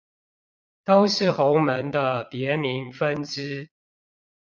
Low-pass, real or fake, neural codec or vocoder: 7.2 kHz; fake; vocoder, 22.05 kHz, 80 mel bands, Vocos